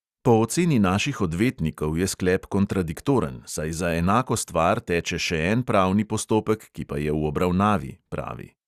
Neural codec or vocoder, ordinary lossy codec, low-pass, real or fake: none; Opus, 64 kbps; 14.4 kHz; real